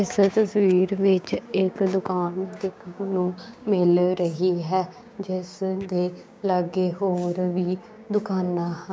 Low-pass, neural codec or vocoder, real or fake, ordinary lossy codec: none; codec, 16 kHz, 6 kbps, DAC; fake; none